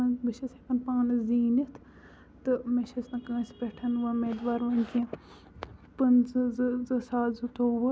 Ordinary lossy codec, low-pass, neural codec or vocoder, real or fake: none; none; none; real